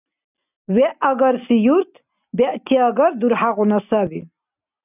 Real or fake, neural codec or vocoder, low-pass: real; none; 3.6 kHz